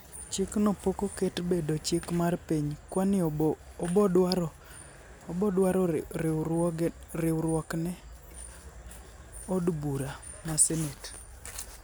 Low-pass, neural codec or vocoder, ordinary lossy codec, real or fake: none; none; none; real